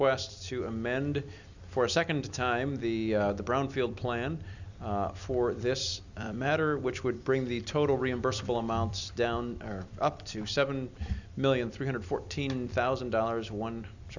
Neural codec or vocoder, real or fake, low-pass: vocoder, 44.1 kHz, 128 mel bands every 256 samples, BigVGAN v2; fake; 7.2 kHz